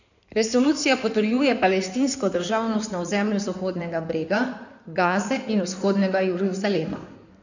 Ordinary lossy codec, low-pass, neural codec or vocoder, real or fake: none; 7.2 kHz; codec, 16 kHz in and 24 kHz out, 2.2 kbps, FireRedTTS-2 codec; fake